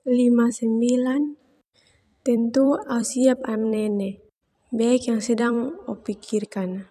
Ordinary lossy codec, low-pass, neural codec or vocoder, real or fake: none; 14.4 kHz; vocoder, 44.1 kHz, 128 mel bands every 256 samples, BigVGAN v2; fake